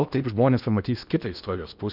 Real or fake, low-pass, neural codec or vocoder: fake; 5.4 kHz; codec, 16 kHz in and 24 kHz out, 0.8 kbps, FocalCodec, streaming, 65536 codes